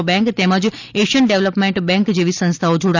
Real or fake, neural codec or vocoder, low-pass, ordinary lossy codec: real; none; 7.2 kHz; none